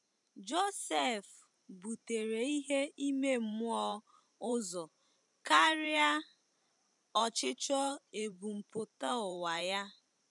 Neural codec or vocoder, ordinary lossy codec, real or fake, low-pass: vocoder, 44.1 kHz, 128 mel bands every 512 samples, BigVGAN v2; MP3, 96 kbps; fake; 10.8 kHz